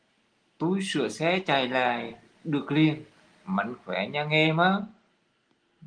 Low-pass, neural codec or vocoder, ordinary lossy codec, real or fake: 9.9 kHz; none; Opus, 24 kbps; real